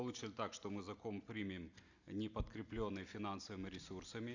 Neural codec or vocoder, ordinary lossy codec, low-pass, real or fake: none; Opus, 64 kbps; 7.2 kHz; real